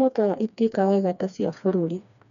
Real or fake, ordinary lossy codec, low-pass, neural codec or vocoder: fake; none; 7.2 kHz; codec, 16 kHz, 2 kbps, FreqCodec, smaller model